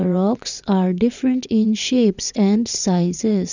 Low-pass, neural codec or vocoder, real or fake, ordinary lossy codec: 7.2 kHz; vocoder, 22.05 kHz, 80 mel bands, WaveNeXt; fake; none